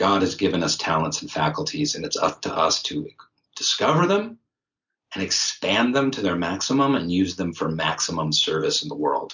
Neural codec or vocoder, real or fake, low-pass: none; real; 7.2 kHz